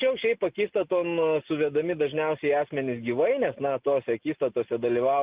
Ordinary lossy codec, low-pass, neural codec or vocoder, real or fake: Opus, 32 kbps; 3.6 kHz; none; real